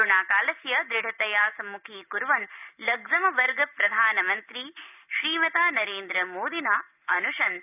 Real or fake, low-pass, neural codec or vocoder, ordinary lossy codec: real; 3.6 kHz; none; none